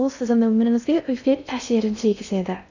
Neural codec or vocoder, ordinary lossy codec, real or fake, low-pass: codec, 16 kHz in and 24 kHz out, 0.6 kbps, FocalCodec, streaming, 2048 codes; none; fake; 7.2 kHz